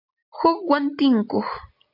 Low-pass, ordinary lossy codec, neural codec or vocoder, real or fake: 5.4 kHz; AAC, 48 kbps; none; real